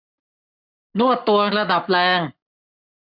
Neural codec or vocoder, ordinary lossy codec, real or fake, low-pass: codec, 44.1 kHz, 7.8 kbps, Pupu-Codec; none; fake; 5.4 kHz